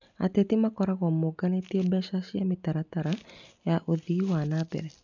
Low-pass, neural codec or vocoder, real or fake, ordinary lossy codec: 7.2 kHz; none; real; none